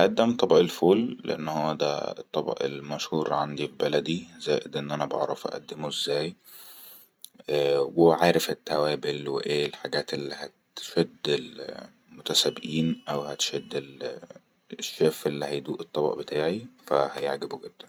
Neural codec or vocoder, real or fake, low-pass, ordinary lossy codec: vocoder, 44.1 kHz, 128 mel bands every 512 samples, BigVGAN v2; fake; none; none